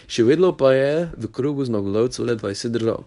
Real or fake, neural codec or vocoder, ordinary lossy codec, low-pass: fake; codec, 24 kHz, 0.9 kbps, WavTokenizer, medium speech release version 1; none; 10.8 kHz